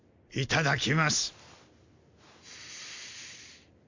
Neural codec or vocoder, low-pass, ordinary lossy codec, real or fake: none; 7.2 kHz; MP3, 64 kbps; real